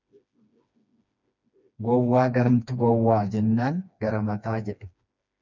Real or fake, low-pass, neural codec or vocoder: fake; 7.2 kHz; codec, 16 kHz, 2 kbps, FreqCodec, smaller model